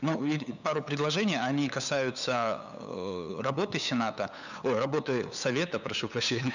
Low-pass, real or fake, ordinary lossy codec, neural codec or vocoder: 7.2 kHz; fake; none; codec, 16 kHz, 8 kbps, FunCodec, trained on LibriTTS, 25 frames a second